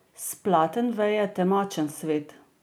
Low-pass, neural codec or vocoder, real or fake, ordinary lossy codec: none; none; real; none